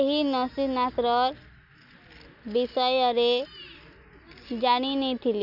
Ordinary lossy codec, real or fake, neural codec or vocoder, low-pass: none; real; none; 5.4 kHz